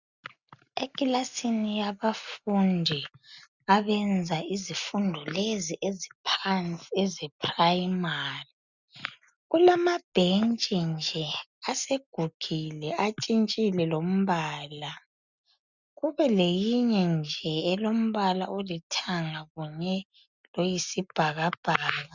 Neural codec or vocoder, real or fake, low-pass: none; real; 7.2 kHz